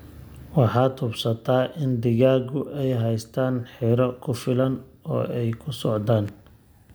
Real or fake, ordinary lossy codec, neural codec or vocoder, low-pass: real; none; none; none